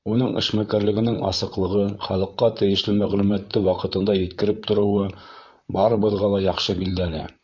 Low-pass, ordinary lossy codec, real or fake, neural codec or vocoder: 7.2 kHz; MP3, 64 kbps; fake; vocoder, 44.1 kHz, 128 mel bands, Pupu-Vocoder